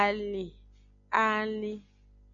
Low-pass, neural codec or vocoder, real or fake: 7.2 kHz; none; real